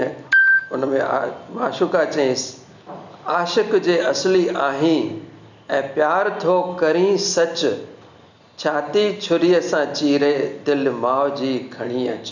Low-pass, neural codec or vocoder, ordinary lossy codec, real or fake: 7.2 kHz; none; none; real